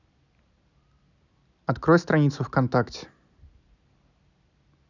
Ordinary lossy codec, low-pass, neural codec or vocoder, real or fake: none; 7.2 kHz; none; real